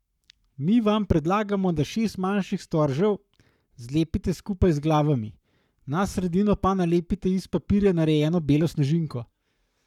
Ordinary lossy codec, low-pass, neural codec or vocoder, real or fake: none; 19.8 kHz; codec, 44.1 kHz, 7.8 kbps, Pupu-Codec; fake